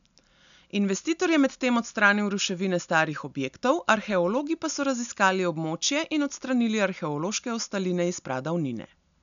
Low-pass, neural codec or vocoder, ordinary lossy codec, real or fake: 7.2 kHz; none; none; real